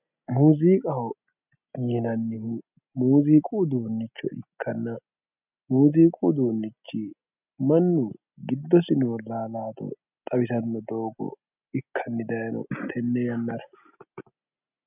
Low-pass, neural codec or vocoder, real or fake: 3.6 kHz; none; real